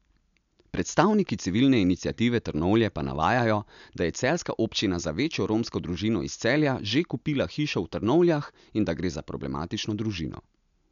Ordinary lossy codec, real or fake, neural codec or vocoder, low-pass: none; real; none; 7.2 kHz